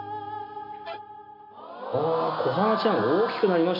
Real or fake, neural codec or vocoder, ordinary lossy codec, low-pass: real; none; none; 5.4 kHz